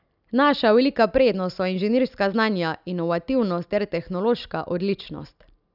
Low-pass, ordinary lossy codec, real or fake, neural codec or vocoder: 5.4 kHz; none; real; none